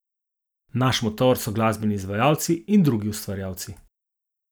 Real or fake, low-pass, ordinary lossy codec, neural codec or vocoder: real; none; none; none